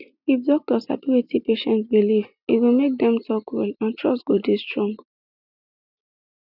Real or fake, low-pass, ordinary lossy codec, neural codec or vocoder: real; 5.4 kHz; none; none